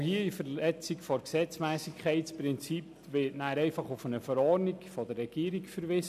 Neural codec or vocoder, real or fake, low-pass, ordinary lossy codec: none; real; 14.4 kHz; none